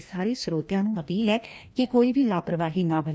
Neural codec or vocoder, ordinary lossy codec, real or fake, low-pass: codec, 16 kHz, 1 kbps, FreqCodec, larger model; none; fake; none